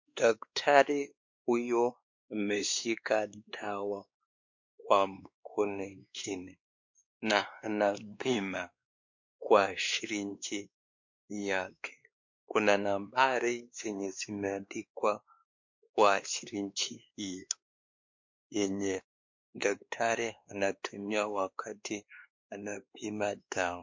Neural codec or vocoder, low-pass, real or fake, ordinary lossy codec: codec, 16 kHz, 2 kbps, X-Codec, WavLM features, trained on Multilingual LibriSpeech; 7.2 kHz; fake; MP3, 48 kbps